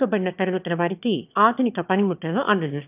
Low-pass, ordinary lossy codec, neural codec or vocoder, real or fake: 3.6 kHz; none; autoencoder, 22.05 kHz, a latent of 192 numbers a frame, VITS, trained on one speaker; fake